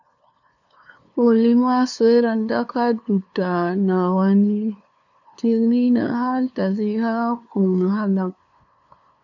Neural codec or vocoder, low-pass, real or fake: codec, 16 kHz, 2 kbps, FunCodec, trained on LibriTTS, 25 frames a second; 7.2 kHz; fake